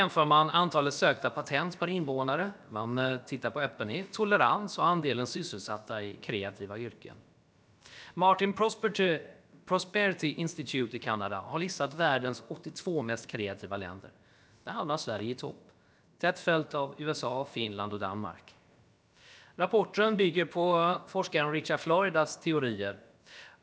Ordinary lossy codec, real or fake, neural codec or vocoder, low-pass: none; fake; codec, 16 kHz, about 1 kbps, DyCAST, with the encoder's durations; none